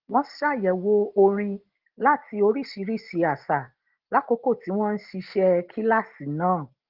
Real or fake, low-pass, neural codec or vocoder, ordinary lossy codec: real; 5.4 kHz; none; Opus, 16 kbps